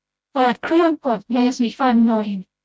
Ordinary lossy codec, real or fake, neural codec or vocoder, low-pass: none; fake; codec, 16 kHz, 0.5 kbps, FreqCodec, smaller model; none